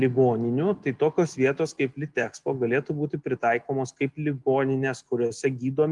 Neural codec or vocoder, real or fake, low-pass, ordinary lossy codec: none; real; 10.8 kHz; Opus, 32 kbps